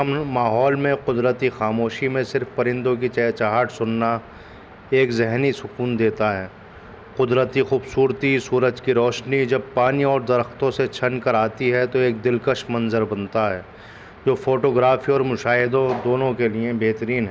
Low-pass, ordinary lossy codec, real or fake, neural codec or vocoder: none; none; real; none